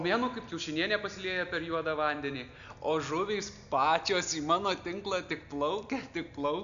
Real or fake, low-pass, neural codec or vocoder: real; 7.2 kHz; none